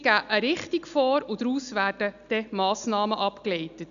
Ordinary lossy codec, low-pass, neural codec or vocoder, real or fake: none; 7.2 kHz; none; real